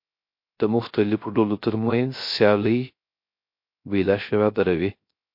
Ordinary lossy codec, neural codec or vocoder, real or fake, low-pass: MP3, 32 kbps; codec, 16 kHz, 0.3 kbps, FocalCodec; fake; 5.4 kHz